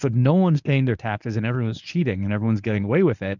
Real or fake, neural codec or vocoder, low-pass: fake; codec, 24 kHz, 0.9 kbps, WavTokenizer, medium speech release version 1; 7.2 kHz